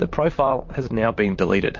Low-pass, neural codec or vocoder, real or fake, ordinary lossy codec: 7.2 kHz; vocoder, 44.1 kHz, 128 mel bands, Pupu-Vocoder; fake; MP3, 48 kbps